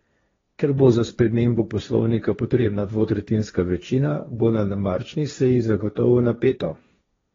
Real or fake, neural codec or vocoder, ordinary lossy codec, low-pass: fake; codec, 16 kHz, 1.1 kbps, Voila-Tokenizer; AAC, 24 kbps; 7.2 kHz